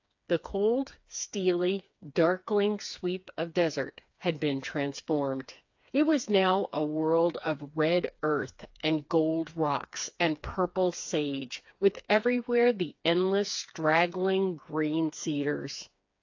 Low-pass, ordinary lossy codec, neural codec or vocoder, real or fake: 7.2 kHz; AAC, 48 kbps; codec, 16 kHz, 4 kbps, FreqCodec, smaller model; fake